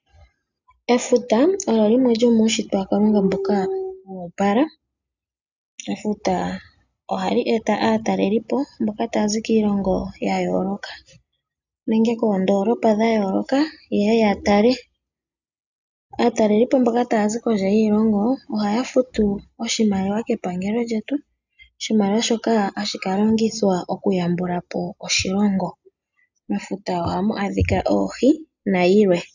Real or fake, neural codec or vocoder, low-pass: real; none; 7.2 kHz